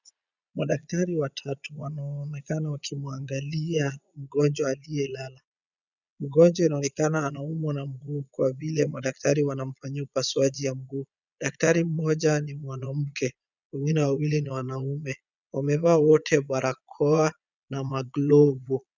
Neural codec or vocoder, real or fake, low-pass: vocoder, 22.05 kHz, 80 mel bands, Vocos; fake; 7.2 kHz